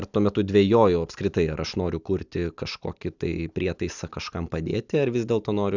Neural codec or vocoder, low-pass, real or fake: none; 7.2 kHz; real